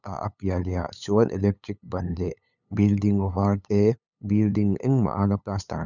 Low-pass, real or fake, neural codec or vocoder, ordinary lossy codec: 7.2 kHz; fake; codec, 16 kHz, 8 kbps, FunCodec, trained on LibriTTS, 25 frames a second; none